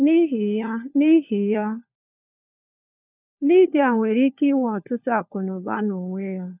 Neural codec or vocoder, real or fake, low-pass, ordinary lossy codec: codec, 16 kHz, 4 kbps, FunCodec, trained on LibriTTS, 50 frames a second; fake; 3.6 kHz; none